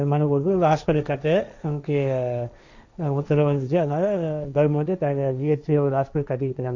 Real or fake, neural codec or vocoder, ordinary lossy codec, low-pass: fake; codec, 16 kHz, 1.1 kbps, Voila-Tokenizer; none; 7.2 kHz